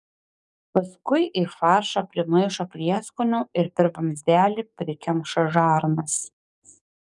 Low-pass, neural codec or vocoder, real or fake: 10.8 kHz; codec, 44.1 kHz, 7.8 kbps, DAC; fake